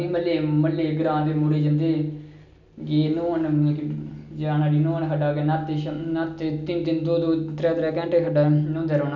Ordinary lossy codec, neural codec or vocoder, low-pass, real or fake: none; none; 7.2 kHz; real